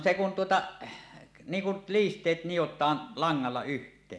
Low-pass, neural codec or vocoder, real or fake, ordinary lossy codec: none; none; real; none